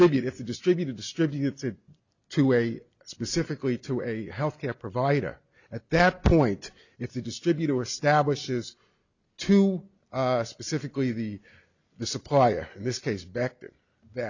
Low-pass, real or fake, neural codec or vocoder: 7.2 kHz; real; none